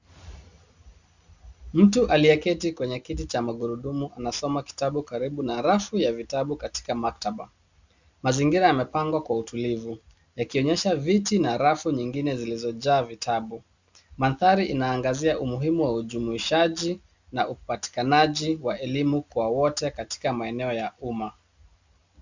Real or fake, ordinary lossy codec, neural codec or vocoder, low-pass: real; Opus, 64 kbps; none; 7.2 kHz